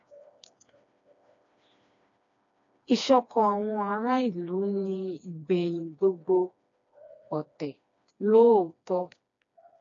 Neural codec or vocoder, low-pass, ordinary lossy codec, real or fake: codec, 16 kHz, 2 kbps, FreqCodec, smaller model; 7.2 kHz; AAC, 48 kbps; fake